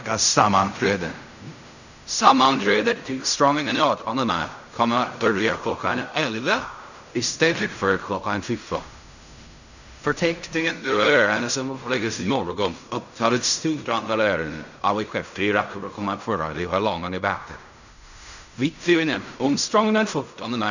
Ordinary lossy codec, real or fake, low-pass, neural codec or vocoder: none; fake; 7.2 kHz; codec, 16 kHz in and 24 kHz out, 0.4 kbps, LongCat-Audio-Codec, fine tuned four codebook decoder